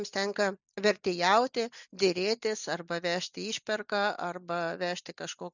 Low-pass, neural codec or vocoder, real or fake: 7.2 kHz; none; real